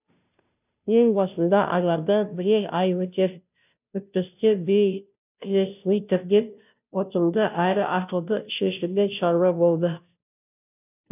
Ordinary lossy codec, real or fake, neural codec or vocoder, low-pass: none; fake; codec, 16 kHz, 0.5 kbps, FunCodec, trained on Chinese and English, 25 frames a second; 3.6 kHz